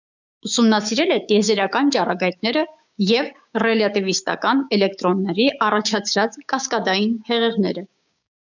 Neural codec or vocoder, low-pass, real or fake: codec, 16 kHz, 6 kbps, DAC; 7.2 kHz; fake